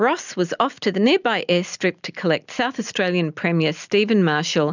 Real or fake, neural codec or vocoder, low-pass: real; none; 7.2 kHz